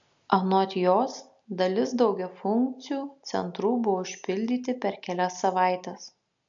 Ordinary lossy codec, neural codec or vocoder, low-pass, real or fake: AAC, 64 kbps; none; 7.2 kHz; real